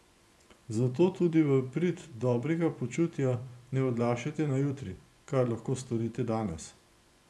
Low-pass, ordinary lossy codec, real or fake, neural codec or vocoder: none; none; fake; vocoder, 24 kHz, 100 mel bands, Vocos